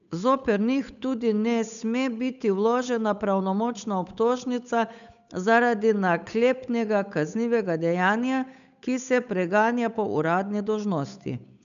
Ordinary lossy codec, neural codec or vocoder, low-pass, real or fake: none; codec, 16 kHz, 8 kbps, FunCodec, trained on Chinese and English, 25 frames a second; 7.2 kHz; fake